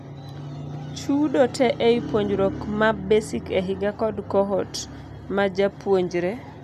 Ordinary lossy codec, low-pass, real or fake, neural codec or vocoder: none; 14.4 kHz; real; none